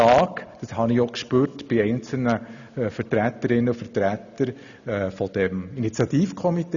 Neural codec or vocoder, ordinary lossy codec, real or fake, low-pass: none; none; real; 7.2 kHz